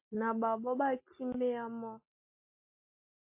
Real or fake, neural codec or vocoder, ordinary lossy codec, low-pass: real; none; MP3, 24 kbps; 3.6 kHz